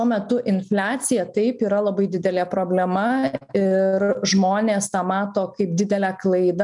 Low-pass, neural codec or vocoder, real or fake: 10.8 kHz; none; real